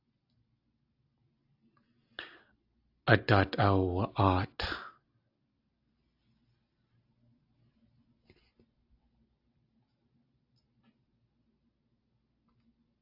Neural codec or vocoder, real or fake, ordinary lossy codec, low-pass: none; real; AAC, 48 kbps; 5.4 kHz